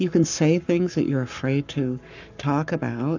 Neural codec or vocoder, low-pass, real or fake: codec, 44.1 kHz, 7.8 kbps, Pupu-Codec; 7.2 kHz; fake